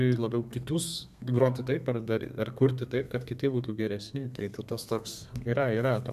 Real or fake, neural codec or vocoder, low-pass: fake; codec, 32 kHz, 1.9 kbps, SNAC; 14.4 kHz